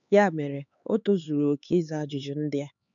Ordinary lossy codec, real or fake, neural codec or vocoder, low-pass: none; fake; codec, 16 kHz, 4 kbps, X-Codec, HuBERT features, trained on LibriSpeech; 7.2 kHz